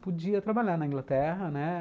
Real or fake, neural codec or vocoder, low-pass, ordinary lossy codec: real; none; none; none